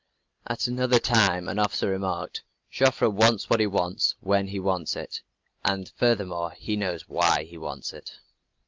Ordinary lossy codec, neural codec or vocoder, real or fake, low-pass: Opus, 32 kbps; none; real; 7.2 kHz